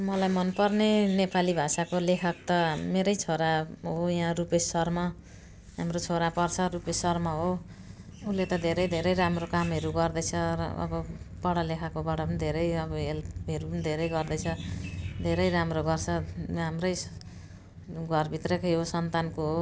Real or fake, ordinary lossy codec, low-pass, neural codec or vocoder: real; none; none; none